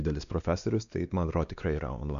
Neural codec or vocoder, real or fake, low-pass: codec, 16 kHz, 2 kbps, X-Codec, WavLM features, trained on Multilingual LibriSpeech; fake; 7.2 kHz